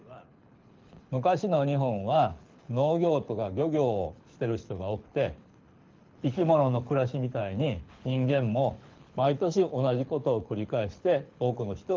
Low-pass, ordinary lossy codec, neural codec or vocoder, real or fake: 7.2 kHz; Opus, 24 kbps; codec, 24 kHz, 6 kbps, HILCodec; fake